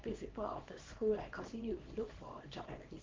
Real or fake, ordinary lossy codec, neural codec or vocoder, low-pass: fake; Opus, 16 kbps; codec, 24 kHz, 0.9 kbps, WavTokenizer, small release; 7.2 kHz